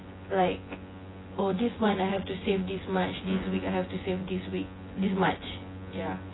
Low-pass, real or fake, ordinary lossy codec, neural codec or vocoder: 7.2 kHz; fake; AAC, 16 kbps; vocoder, 24 kHz, 100 mel bands, Vocos